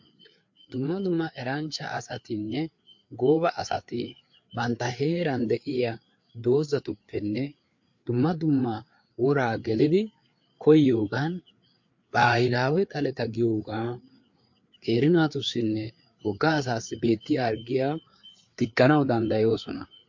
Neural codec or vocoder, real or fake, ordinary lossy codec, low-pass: codec, 16 kHz, 4 kbps, FreqCodec, larger model; fake; MP3, 48 kbps; 7.2 kHz